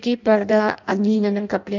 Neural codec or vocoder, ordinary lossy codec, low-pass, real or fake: codec, 16 kHz in and 24 kHz out, 0.6 kbps, FireRedTTS-2 codec; none; 7.2 kHz; fake